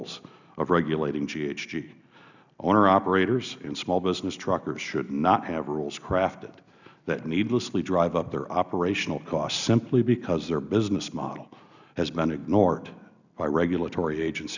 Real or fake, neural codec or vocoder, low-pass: real; none; 7.2 kHz